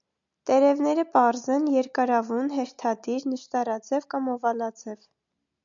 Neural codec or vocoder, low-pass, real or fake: none; 7.2 kHz; real